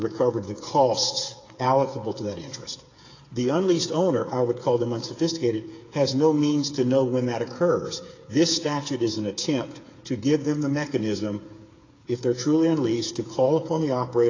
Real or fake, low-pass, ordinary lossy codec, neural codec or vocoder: fake; 7.2 kHz; AAC, 32 kbps; codec, 16 kHz, 8 kbps, FreqCodec, smaller model